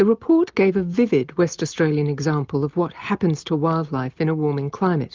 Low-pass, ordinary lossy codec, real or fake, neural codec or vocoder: 7.2 kHz; Opus, 32 kbps; real; none